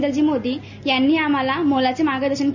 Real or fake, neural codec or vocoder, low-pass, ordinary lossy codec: real; none; 7.2 kHz; none